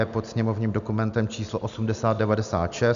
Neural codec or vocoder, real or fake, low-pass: none; real; 7.2 kHz